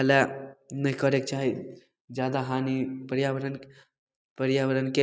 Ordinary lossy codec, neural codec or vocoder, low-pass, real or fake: none; none; none; real